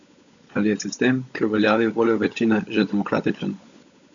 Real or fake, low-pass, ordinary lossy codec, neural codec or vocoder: fake; 7.2 kHz; none; codec, 16 kHz, 16 kbps, FunCodec, trained on LibriTTS, 50 frames a second